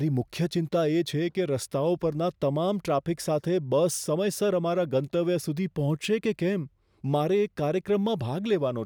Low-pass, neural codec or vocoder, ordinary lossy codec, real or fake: none; none; none; real